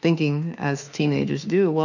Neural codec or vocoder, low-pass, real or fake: autoencoder, 48 kHz, 32 numbers a frame, DAC-VAE, trained on Japanese speech; 7.2 kHz; fake